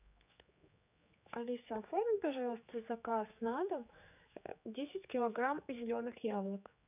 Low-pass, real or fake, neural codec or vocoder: 3.6 kHz; fake; codec, 16 kHz, 4 kbps, X-Codec, HuBERT features, trained on general audio